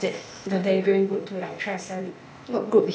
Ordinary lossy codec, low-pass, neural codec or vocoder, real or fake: none; none; codec, 16 kHz, 0.8 kbps, ZipCodec; fake